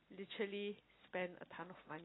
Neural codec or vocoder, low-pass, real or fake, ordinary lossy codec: none; 7.2 kHz; real; AAC, 16 kbps